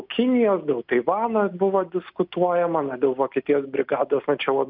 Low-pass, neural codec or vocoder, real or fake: 5.4 kHz; none; real